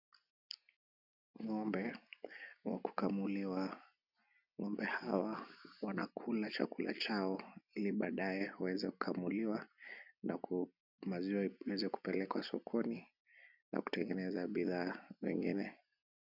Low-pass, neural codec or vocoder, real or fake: 5.4 kHz; none; real